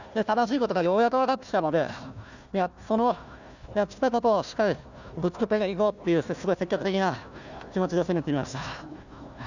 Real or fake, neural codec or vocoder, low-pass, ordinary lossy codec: fake; codec, 16 kHz, 1 kbps, FunCodec, trained on Chinese and English, 50 frames a second; 7.2 kHz; none